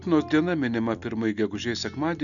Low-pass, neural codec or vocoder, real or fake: 7.2 kHz; none; real